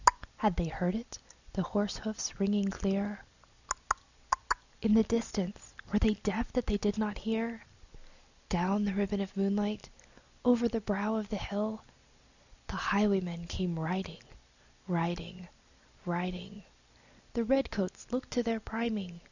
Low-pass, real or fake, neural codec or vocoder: 7.2 kHz; real; none